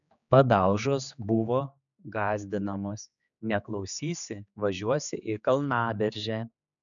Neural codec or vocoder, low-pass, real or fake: codec, 16 kHz, 4 kbps, X-Codec, HuBERT features, trained on general audio; 7.2 kHz; fake